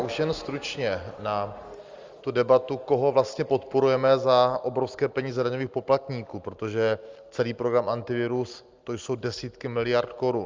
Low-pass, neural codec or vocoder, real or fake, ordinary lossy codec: 7.2 kHz; none; real; Opus, 32 kbps